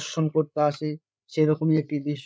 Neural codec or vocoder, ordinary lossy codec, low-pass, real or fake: codec, 16 kHz, 8 kbps, FreqCodec, larger model; none; none; fake